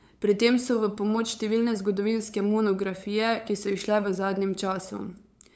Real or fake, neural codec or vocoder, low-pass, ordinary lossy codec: fake; codec, 16 kHz, 8 kbps, FunCodec, trained on LibriTTS, 25 frames a second; none; none